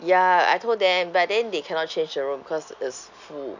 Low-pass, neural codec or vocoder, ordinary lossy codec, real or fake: 7.2 kHz; none; none; real